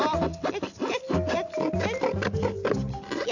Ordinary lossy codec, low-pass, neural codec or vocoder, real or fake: none; 7.2 kHz; none; real